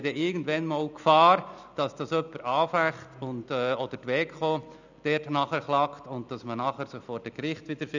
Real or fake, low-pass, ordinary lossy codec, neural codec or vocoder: real; 7.2 kHz; none; none